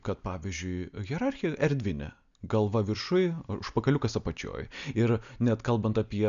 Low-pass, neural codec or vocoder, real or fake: 7.2 kHz; none; real